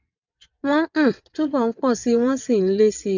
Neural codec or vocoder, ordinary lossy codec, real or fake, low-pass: vocoder, 22.05 kHz, 80 mel bands, WaveNeXt; none; fake; 7.2 kHz